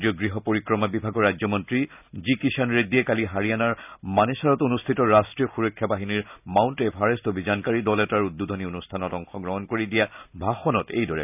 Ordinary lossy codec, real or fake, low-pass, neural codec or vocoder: none; real; 3.6 kHz; none